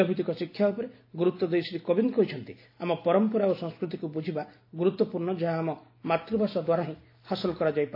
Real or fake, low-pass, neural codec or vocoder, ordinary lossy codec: real; 5.4 kHz; none; AAC, 32 kbps